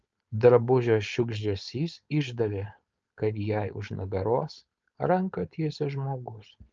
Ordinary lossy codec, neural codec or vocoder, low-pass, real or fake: Opus, 24 kbps; codec, 16 kHz, 4.8 kbps, FACodec; 7.2 kHz; fake